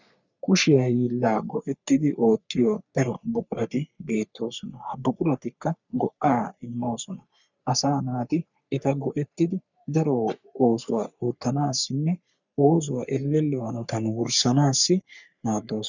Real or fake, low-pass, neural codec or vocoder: fake; 7.2 kHz; codec, 44.1 kHz, 3.4 kbps, Pupu-Codec